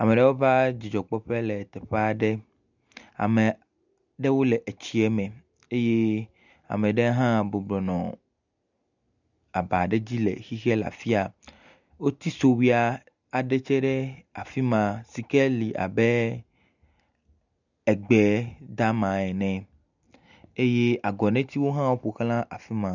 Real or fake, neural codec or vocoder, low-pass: real; none; 7.2 kHz